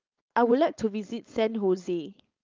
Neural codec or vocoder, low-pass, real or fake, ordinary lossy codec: codec, 16 kHz, 4.8 kbps, FACodec; 7.2 kHz; fake; Opus, 24 kbps